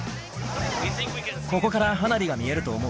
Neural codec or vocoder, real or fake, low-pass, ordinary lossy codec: none; real; none; none